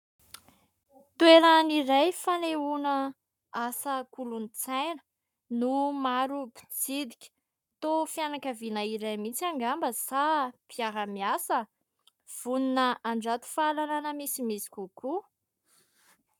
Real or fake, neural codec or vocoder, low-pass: fake; codec, 44.1 kHz, 7.8 kbps, Pupu-Codec; 19.8 kHz